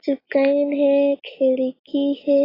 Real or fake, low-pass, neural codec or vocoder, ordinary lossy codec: real; 5.4 kHz; none; AAC, 24 kbps